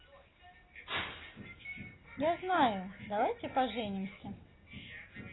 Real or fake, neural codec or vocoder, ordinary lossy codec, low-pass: real; none; AAC, 16 kbps; 7.2 kHz